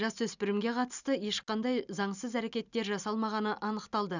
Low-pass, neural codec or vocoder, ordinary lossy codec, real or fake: 7.2 kHz; none; none; real